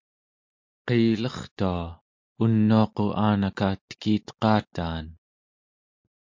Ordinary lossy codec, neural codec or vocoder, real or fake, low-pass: MP3, 48 kbps; none; real; 7.2 kHz